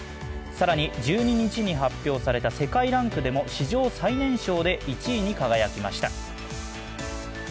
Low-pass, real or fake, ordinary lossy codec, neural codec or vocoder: none; real; none; none